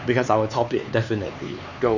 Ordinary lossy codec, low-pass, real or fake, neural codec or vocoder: none; 7.2 kHz; fake; codec, 16 kHz, 4 kbps, X-Codec, HuBERT features, trained on LibriSpeech